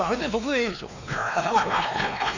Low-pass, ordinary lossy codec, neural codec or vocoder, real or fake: 7.2 kHz; none; codec, 16 kHz, 2 kbps, X-Codec, WavLM features, trained on Multilingual LibriSpeech; fake